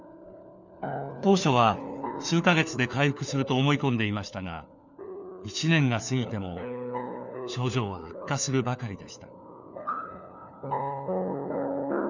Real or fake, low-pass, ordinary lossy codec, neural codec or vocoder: fake; 7.2 kHz; none; codec, 16 kHz, 4 kbps, FunCodec, trained on LibriTTS, 50 frames a second